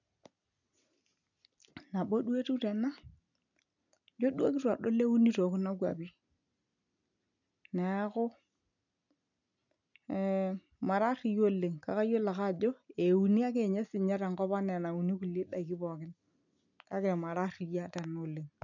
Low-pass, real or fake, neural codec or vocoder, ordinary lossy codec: 7.2 kHz; real; none; none